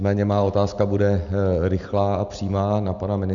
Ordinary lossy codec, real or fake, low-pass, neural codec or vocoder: MP3, 96 kbps; real; 7.2 kHz; none